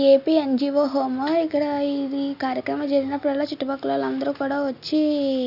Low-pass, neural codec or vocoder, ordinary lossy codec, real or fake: 5.4 kHz; none; none; real